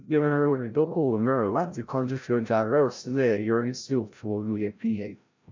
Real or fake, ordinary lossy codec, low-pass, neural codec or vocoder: fake; MP3, 64 kbps; 7.2 kHz; codec, 16 kHz, 0.5 kbps, FreqCodec, larger model